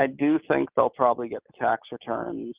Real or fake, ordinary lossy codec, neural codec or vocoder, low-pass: real; Opus, 32 kbps; none; 3.6 kHz